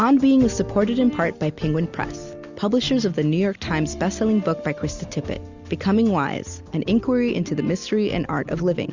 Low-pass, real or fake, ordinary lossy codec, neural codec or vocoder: 7.2 kHz; real; Opus, 64 kbps; none